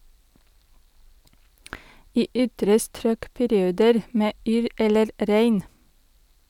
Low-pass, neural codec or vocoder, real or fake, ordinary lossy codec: 19.8 kHz; none; real; none